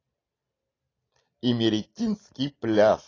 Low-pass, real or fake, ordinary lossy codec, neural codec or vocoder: 7.2 kHz; real; AAC, 32 kbps; none